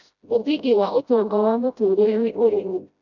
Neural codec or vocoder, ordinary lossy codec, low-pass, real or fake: codec, 16 kHz, 0.5 kbps, FreqCodec, smaller model; none; 7.2 kHz; fake